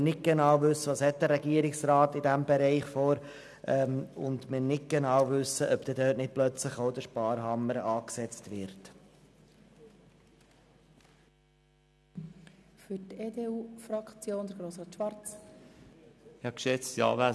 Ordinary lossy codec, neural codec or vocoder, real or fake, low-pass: none; none; real; none